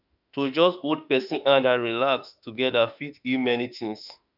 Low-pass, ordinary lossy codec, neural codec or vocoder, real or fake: 5.4 kHz; none; autoencoder, 48 kHz, 32 numbers a frame, DAC-VAE, trained on Japanese speech; fake